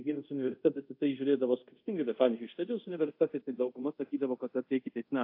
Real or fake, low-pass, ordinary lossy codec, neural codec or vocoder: fake; 5.4 kHz; AAC, 32 kbps; codec, 24 kHz, 0.5 kbps, DualCodec